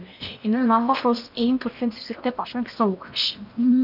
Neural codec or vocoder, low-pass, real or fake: codec, 16 kHz in and 24 kHz out, 0.8 kbps, FocalCodec, streaming, 65536 codes; 5.4 kHz; fake